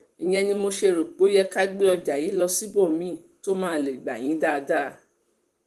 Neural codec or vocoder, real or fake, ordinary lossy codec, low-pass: vocoder, 44.1 kHz, 128 mel bands, Pupu-Vocoder; fake; Opus, 24 kbps; 14.4 kHz